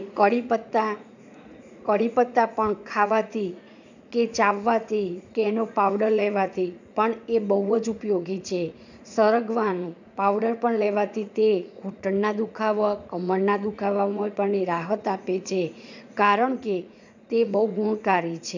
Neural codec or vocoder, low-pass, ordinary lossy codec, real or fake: vocoder, 44.1 kHz, 80 mel bands, Vocos; 7.2 kHz; none; fake